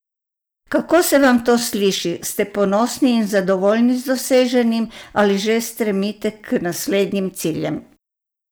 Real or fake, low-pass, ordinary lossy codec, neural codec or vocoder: real; none; none; none